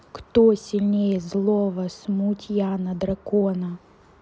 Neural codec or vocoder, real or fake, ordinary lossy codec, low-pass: none; real; none; none